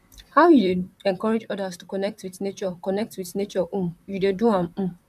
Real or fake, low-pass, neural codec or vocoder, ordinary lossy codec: fake; 14.4 kHz; vocoder, 44.1 kHz, 128 mel bands, Pupu-Vocoder; none